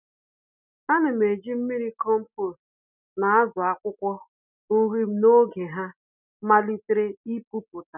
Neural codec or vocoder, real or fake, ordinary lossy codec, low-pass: none; real; none; 3.6 kHz